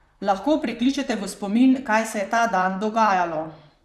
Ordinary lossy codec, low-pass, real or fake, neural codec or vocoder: none; 14.4 kHz; fake; vocoder, 44.1 kHz, 128 mel bands, Pupu-Vocoder